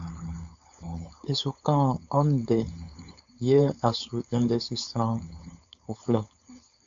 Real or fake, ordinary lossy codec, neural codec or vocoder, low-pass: fake; AAC, 64 kbps; codec, 16 kHz, 4.8 kbps, FACodec; 7.2 kHz